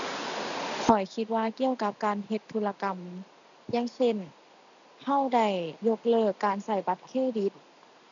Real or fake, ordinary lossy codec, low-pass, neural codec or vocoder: real; none; 7.2 kHz; none